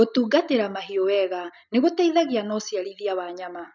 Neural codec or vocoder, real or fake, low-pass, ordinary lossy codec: none; real; 7.2 kHz; none